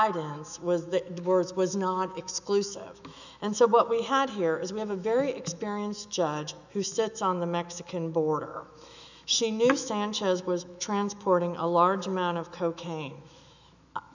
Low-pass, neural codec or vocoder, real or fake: 7.2 kHz; autoencoder, 48 kHz, 128 numbers a frame, DAC-VAE, trained on Japanese speech; fake